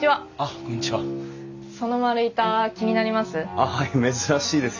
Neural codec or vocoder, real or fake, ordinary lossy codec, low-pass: none; real; none; 7.2 kHz